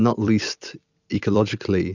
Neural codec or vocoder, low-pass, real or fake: vocoder, 44.1 kHz, 128 mel bands every 256 samples, BigVGAN v2; 7.2 kHz; fake